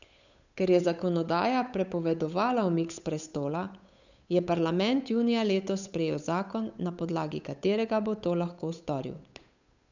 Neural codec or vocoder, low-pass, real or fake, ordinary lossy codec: codec, 16 kHz, 8 kbps, FunCodec, trained on Chinese and English, 25 frames a second; 7.2 kHz; fake; none